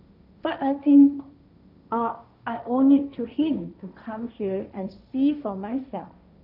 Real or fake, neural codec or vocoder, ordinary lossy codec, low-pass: fake; codec, 16 kHz, 1.1 kbps, Voila-Tokenizer; none; 5.4 kHz